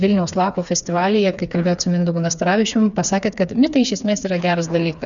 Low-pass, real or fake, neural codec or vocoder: 7.2 kHz; fake; codec, 16 kHz, 4 kbps, FreqCodec, smaller model